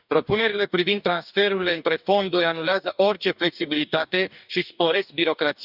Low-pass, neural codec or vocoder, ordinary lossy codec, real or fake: 5.4 kHz; codec, 44.1 kHz, 2.6 kbps, DAC; none; fake